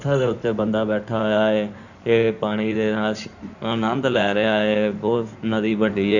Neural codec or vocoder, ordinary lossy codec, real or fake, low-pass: codec, 16 kHz in and 24 kHz out, 2.2 kbps, FireRedTTS-2 codec; none; fake; 7.2 kHz